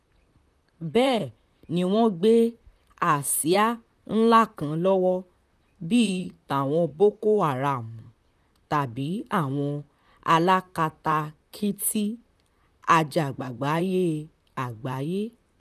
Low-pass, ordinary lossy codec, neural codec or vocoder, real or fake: 14.4 kHz; none; vocoder, 44.1 kHz, 128 mel bands, Pupu-Vocoder; fake